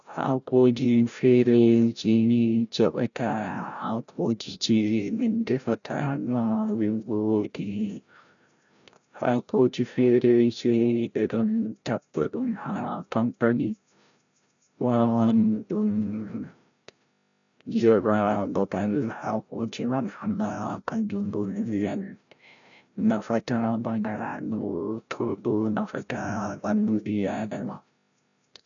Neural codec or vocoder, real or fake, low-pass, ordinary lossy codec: codec, 16 kHz, 0.5 kbps, FreqCodec, larger model; fake; 7.2 kHz; none